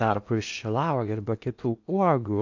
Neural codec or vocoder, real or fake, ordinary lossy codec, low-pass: codec, 16 kHz in and 24 kHz out, 0.8 kbps, FocalCodec, streaming, 65536 codes; fake; AAC, 48 kbps; 7.2 kHz